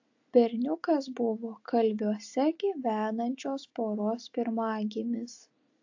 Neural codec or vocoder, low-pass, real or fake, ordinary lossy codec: none; 7.2 kHz; real; AAC, 48 kbps